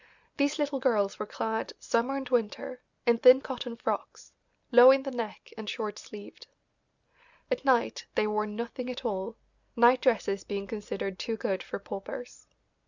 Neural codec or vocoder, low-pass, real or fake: none; 7.2 kHz; real